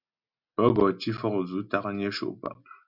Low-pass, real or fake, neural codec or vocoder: 5.4 kHz; real; none